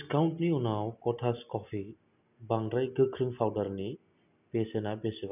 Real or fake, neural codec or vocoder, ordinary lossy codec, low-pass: real; none; none; 3.6 kHz